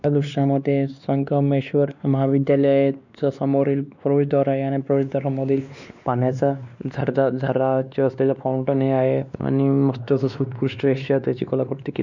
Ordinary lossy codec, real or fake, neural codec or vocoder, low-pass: none; fake; codec, 16 kHz, 2 kbps, X-Codec, WavLM features, trained on Multilingual LibriSpeech; 7.2 kHz